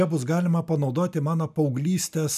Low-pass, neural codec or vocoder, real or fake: 14.4 kHz; none; real